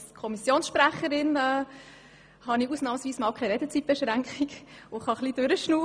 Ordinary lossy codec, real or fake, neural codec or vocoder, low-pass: MP3, 96 kbps; real; none; 9.9 kHz